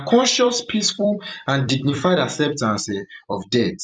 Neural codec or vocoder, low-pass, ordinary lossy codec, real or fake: vocoder, 44.1 kHz, 128 mel bands every 256 samples, BigVGAN v2; 9.9 kHz; none; fake